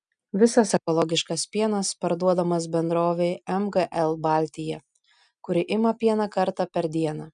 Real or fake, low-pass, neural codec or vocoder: real; 9.9 kHz; none